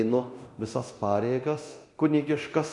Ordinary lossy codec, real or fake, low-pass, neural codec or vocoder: MP3, 48 kbps; fake; 10.8 kHz; codec, 24 kHz, 0.9 kbps, DualCodec